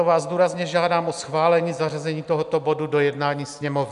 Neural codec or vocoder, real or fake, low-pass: none; real; 10.8 kHz